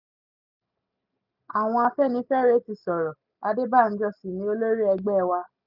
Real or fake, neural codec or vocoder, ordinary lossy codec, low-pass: real; none; none; 5.4 kHz